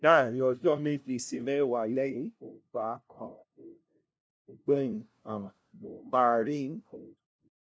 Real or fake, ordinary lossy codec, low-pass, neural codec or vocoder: fake; none; none; codec, 16 kHz, 0.5 kbps, FunCodec, trained on LibriTTS, 25 frames a second